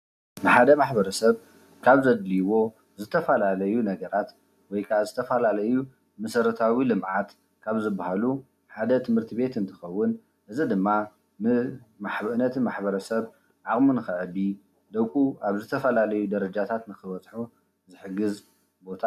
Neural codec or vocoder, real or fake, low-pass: none; real; 14.4 kHz